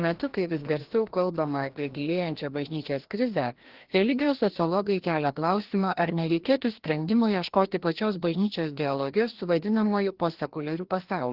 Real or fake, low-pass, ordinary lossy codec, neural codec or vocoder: fake; 5.4 kHz; Opus, 16 kbps; codec, 16 kHz, 1 kbps, FreqCodec, larger model